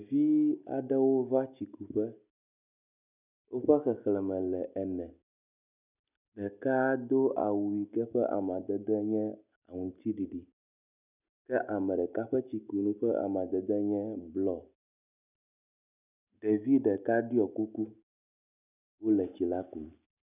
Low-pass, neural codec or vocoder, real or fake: 3.6 kHz; none; real